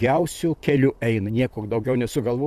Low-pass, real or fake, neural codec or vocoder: 14.4 kHz; fake; vocoder, 44.1 kHz, 128 mel bands, Pupu-Vocoder